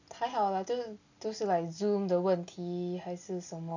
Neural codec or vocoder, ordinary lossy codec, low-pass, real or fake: none; none; 7.2 kHz; real